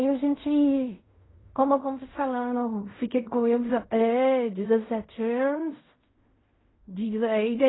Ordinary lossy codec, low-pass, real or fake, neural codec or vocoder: AAC, 16 kbps; 7.2 kHz; fake; codec, 16 kHz in and 24 kHz out, 0.4 kbps, LongCat-Audio-Codec, fine tuned four codebook decoder